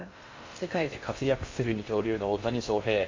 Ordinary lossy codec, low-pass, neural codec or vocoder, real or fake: MP3, 48 kbps; 7.2 kHz; codec, 16 kHz in and 24 kHz out, 0.6 kbps, FocalCodec, streaming, 2048 codes; fake